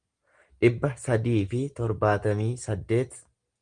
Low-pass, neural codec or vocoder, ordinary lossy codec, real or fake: 9.9 kHz; none; Opus, 24 kbps; real